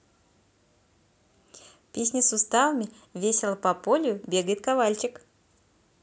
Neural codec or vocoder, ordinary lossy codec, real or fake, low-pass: none; none; real; none